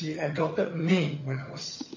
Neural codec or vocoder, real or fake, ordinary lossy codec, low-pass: vocoder, 22.05 kHz, 80 mel bands, HiFi-GAN; fake; MP3, 32 kbps; 7.2 kHz